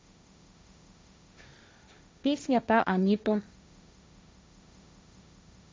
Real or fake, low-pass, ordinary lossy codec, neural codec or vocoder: fake; none; none; codec, 16 kHz, 1.1 kbps, Voila-Tokenizer